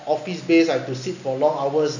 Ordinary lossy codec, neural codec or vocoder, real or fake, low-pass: none; none; real; 7.2 kHz